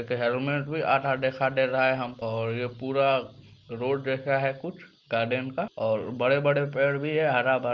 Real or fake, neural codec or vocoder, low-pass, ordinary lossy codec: real; none; 7.2 kHz; Opus, 32 kbps